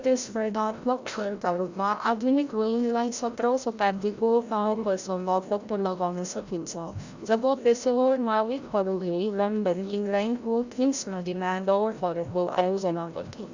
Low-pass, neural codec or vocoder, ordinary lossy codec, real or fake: 7.2 kHz; codec, 16 kHz, 0.5 kbps, FreqCodec, larger model; Opus, 64 kbps; fake